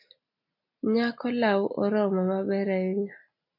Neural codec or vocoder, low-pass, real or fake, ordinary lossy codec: none; 5.4 kHz; real; MP3, 32 kbps